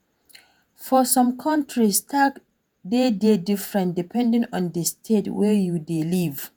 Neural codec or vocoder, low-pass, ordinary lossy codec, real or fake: vocoder, 48 kHz, 128 mel bands, Vocos; none; none; fake